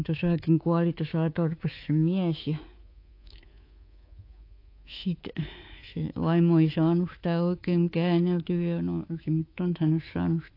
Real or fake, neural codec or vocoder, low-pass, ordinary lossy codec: fake; codec, 24 kHz, 3.1 kbps, DualCodec; 5.4 kHz; AAC, 32 kbps